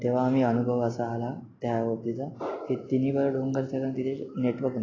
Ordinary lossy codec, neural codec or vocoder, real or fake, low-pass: AAC, 32 kbps; none; real; 7.2 kHz